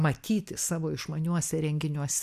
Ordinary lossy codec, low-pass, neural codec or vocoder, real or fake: MP3, 96 kbps; 14.4 kHz; none; real